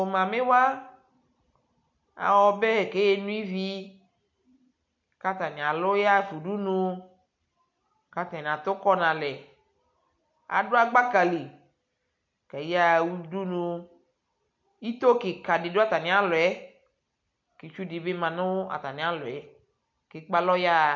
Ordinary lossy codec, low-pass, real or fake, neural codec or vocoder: MP3, 48 kbps; 7.2 kHz; real; none